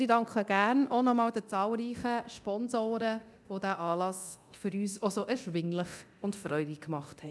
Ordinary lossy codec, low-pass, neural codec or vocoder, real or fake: none; none; codec, 24 kHz, 0.9 kbps, DualCodec; fake